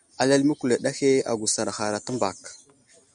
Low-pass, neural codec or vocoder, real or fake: 9.9 kHz; none; real